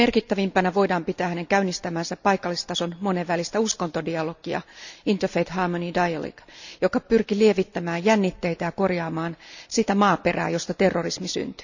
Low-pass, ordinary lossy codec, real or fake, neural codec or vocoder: 7.2 kHz; none; real; none